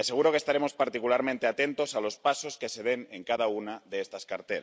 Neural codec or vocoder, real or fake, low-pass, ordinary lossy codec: none; real; none; none